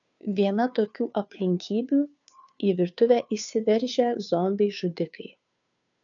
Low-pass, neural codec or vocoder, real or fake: 7.2 kHz; codec, 16 kHz, 2 kbps, FunCodec, trained on Chinese and English, 25 frames a second; fake